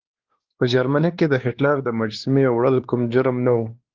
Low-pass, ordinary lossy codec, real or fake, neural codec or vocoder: 7.2 kHz; Opus, 16 kbps; fake; codec, 16 kHz, 2 kbps, X-Codec, WavLM features, trained on Multilingual LibriSpeech